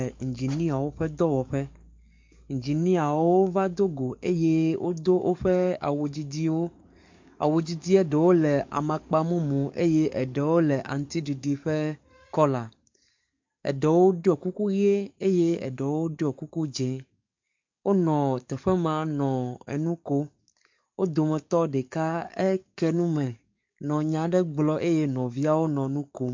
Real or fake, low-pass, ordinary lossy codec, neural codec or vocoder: fake; 7.2 kHz; MP3, 48 kbps; codec, 44.1 kHz, 7.8 kbps, DAC